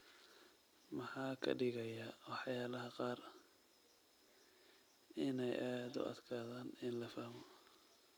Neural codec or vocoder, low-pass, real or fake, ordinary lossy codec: vocoder, 44.1 kHz, 128 mel bands every 256 samples, BigVGAN v2; none; fake; none